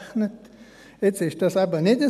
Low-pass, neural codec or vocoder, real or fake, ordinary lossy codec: 14.4 kHz; none; real; none